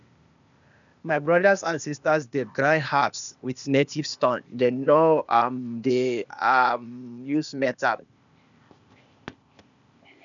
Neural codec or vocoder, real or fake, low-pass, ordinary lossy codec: codec, 16 kHz, 0.8 kbps, ZipCodec; fake; 7.2 kHz; none